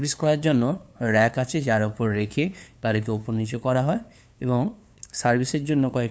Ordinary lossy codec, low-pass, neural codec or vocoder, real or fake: none; none; codec, 16 kHz, 2 kbps, FunCodec, trained on LibriTTS, 25 frames a second; fake